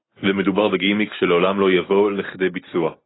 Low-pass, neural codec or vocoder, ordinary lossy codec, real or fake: 7.2 kHz; none; AAC, 16 kbps; real